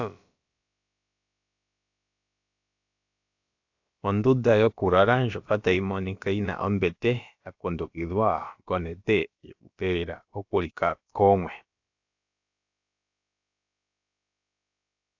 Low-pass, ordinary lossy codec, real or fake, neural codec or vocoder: 7.2 kHz; AAC, 48 kbps; fake; codec, 16 kHz, about 1 kbps, DyCAST, with the encoder's durations